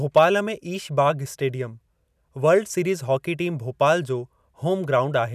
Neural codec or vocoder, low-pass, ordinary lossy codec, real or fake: none; 14.4 kHz; none; real